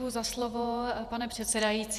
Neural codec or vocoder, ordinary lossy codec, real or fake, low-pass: vocoder, 48 kHz, 128 mel bands, Vocos; Opus, 64 kbps; fake; 14.4 kHz